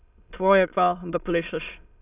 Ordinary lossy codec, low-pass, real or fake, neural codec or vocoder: none; 3.6 kHz; fake; autoencoder, 22.05 kHz, a latent of 192 numbers a frame, VITS, trained on many speakers